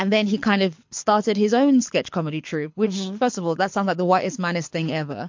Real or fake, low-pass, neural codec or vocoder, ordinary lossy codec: fake; 7.2 kHz; codec, 24 kHz, 6 kbps, HILCodec; MP3, 48 kbps